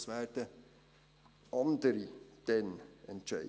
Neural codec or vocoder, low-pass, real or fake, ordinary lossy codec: none; none; real; none